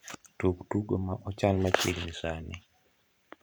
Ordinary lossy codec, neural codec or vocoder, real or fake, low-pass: none; vocoder, 44.1 kHz, 128 mel bands every 512 samples, BigVGAN v2; fake; none